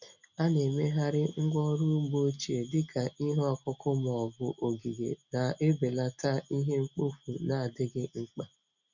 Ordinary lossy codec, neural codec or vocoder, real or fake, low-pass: none; none; real; 7.2 kHz